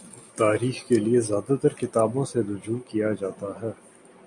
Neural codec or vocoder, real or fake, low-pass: none; real; 10.8 kHz